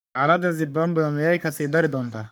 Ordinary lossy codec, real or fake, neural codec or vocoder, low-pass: none; fake; codec, 44.1 kHz, 3.4 kbps, Pupu-Codec; none